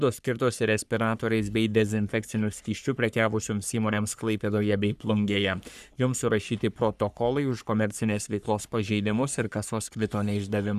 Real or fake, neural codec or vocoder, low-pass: fake; codec, 44.1 kHz, 3.4 kbps, Pupu-Codec; 14.4 kHz